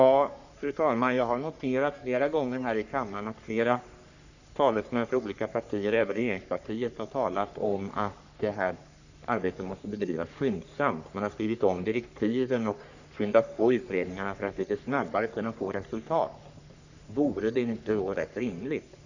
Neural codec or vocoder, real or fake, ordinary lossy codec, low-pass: codec, 44.1 kHz, 3.4 kbps, Pupu-Codec; fake; none; 7.2 kHz